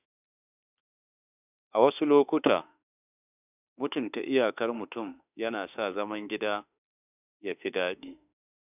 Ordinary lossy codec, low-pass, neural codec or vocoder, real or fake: none; 3.6 kHz; autoencoder, 48 kHz, 32 numbers a frame, DAC-VAE, trained on Japanese speech; fake